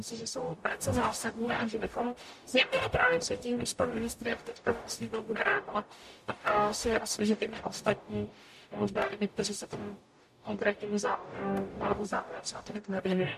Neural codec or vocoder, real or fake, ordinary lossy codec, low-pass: codec, 44.1 kHz, 0.9 kbps, DAC; fake; MP3, 64 kbps; 14.4 kHz